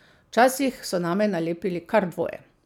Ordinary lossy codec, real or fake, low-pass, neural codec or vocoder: none; real; 19.8 kHz; none